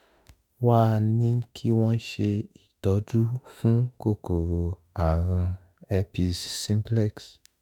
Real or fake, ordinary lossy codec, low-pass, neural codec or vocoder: fake; none; 19.8 kHz; autoencoder, 48 kHz, 32 numbers a frame, DAC-VAE, trained on Japanese speech